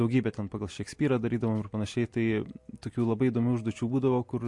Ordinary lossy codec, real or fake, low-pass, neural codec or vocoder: MP3, 48 kbps; real; 10.8 kHz; none